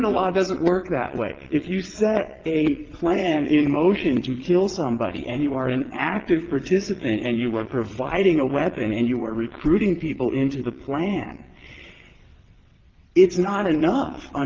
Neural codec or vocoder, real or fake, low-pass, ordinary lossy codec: vocoder, 22.05 kHz, 80 mel bands, WaveNeXt; fake; 7.2 kHz; Opus, 16 kbps